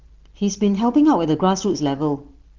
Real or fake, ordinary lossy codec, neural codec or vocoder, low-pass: real; Opus, 16 kbps; none; 7.2 kHz